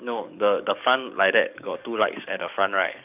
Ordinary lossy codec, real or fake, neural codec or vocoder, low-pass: none; fake; codec, 44.1 kHz, 7.8 kbps, DAC; 3.6 kHz